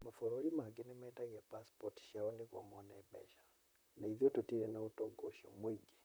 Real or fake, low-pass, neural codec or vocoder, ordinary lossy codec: fake; none; vocoder, 44.1 kHz, 128 mel bands, Pupu-Vocoder; none